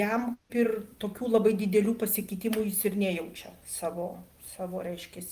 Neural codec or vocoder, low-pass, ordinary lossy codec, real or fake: none; 14.4 kHz; Opus, 32 kbps; real